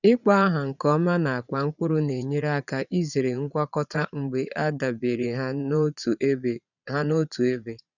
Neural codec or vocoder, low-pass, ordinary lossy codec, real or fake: vocoder, 22.05 kHz, 80 mel bands, Vocos; 7.2 kHz; none; fake